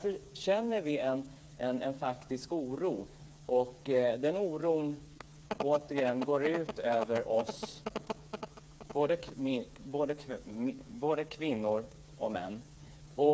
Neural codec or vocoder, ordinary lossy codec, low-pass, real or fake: codec, 16 kHz, 4 kbps, FreqCodec, smaller model; none; none; fake